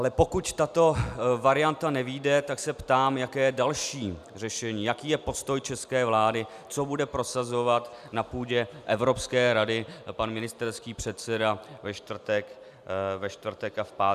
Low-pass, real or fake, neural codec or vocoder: 14.4 kHz; real; none